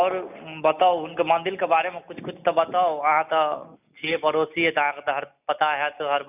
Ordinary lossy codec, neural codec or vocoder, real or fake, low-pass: none; none; real; 3.6 kHz